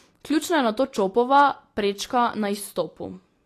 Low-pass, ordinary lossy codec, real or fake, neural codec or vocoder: 14.4 kHz; AAC, 48 kbps; real; none